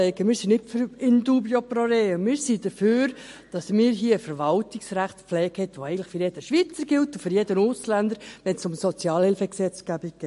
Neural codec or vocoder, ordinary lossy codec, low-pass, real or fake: none; MP3, 48 kbps; 14.4 kHz; real